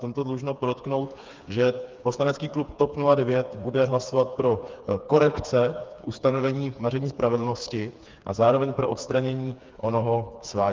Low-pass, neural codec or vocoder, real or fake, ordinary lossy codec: 7.2 kHz; codec, 16 kHz, 4 kbps, FreqCodec, smaller model; fake; Opus, 24 kbps